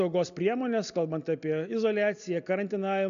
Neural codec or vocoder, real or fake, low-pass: none; real; 7.2 kHz